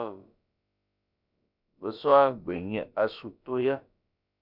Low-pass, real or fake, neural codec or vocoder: 5.4 kHz; fake; codec, 16 kHz, about 1 kbps, DyCAST, with the encoder's durations